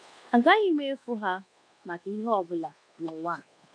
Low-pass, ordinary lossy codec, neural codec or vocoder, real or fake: 9.9 kHz; none; codec, 24 kHz, 1.2 kbps, DualCodec; fake